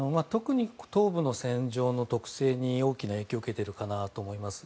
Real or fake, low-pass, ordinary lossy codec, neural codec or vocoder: real; none; none; none